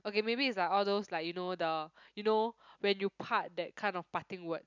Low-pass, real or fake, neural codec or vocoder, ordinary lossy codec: 7.2 kHz; real; none; none